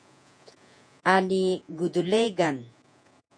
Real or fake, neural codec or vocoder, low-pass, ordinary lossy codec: fake; vocoder, 48 kHz, 128 mel bands, Vocos; 9.9 kHz; MP3, 64 kbps